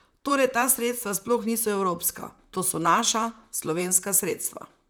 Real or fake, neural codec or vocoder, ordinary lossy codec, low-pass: fake; vocoder, 44.1 kHz, 128 mel bands, Pupu-Vocoder; none; none